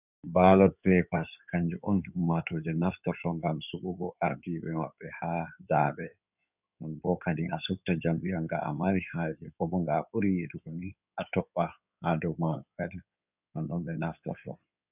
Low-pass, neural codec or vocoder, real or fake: 3.6 kHz; codec, 16 kHz in and 24 kHz out, 2.2 kbps, FireRedTTS-2 codec; fake